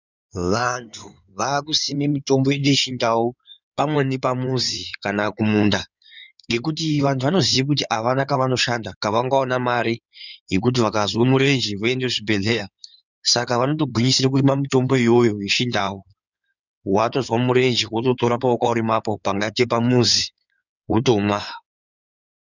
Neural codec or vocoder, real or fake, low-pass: codec, 16 kHz in and 24 kHz out, 2.2 kbps, FireRedTTS-2 codec; fake; 7.2 kHz